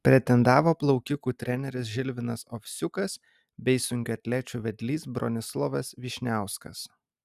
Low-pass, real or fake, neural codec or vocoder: 14.4 kHz; real; none